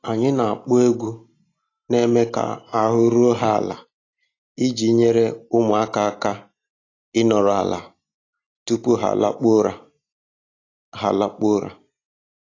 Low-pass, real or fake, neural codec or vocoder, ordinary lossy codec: 7.2 kHz; real; none; AAC, 32 kbps